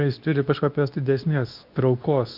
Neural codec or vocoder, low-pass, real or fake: codec, 24 kHz, 0.9 kbps, WavTokenizer, medium speech release version 2; 5.4 kHz; fake